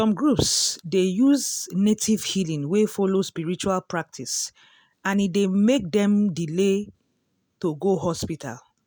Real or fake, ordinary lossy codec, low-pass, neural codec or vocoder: real; none; none; none